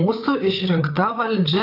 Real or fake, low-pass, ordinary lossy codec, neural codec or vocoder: fake; 5.4 kHz; AAC, 32 kbps; codec, 16 kHz, 8 kbps, FreqCodec, larger model